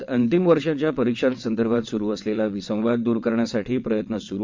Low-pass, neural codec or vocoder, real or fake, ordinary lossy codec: 7.2 kHz; vocoder, 22.05 kHz, 80 mel bands, WaveNeXt; fake; MP3, 64 kbps